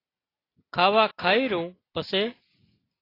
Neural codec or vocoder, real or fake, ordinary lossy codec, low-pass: none; real; AAC, 24 kbps; 5.4 kHz